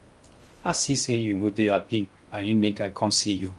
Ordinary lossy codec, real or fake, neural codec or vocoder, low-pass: Opus, 32 kbps; fake; codec, 16 kHz in and 24 kHz out, 0.6 kbps, FocalCodec, streaming, 2048 codes; 10.8 kHz